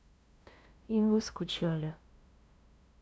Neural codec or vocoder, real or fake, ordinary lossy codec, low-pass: codec, 16 kHz, 0.5 kbps, FunCodec, trained on LibriTTS, 25 frames a second; fake; none; none